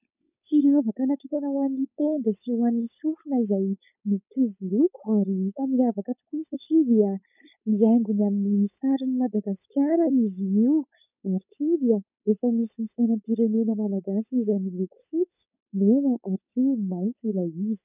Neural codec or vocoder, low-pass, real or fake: codec, 16 kHz, 4.8 kbps, FACodec; 3.6 kHz; fake